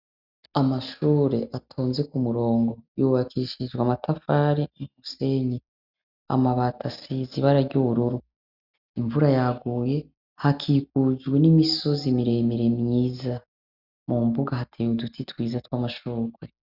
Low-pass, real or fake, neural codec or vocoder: 5.4 kHz; real; none